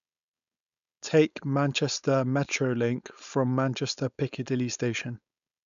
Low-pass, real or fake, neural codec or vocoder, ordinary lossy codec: 7.2 kHz; fake; codec, 16 kHz, 4.8 kbps, FACodec; none